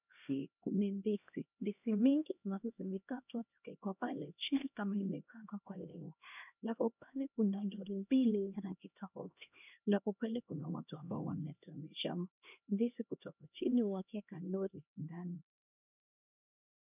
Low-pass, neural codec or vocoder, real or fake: 3.6 kHz; codec, 16 kHz, 1 kbps, X-Codec, HuBERT features, trained on LibriSpeech; fake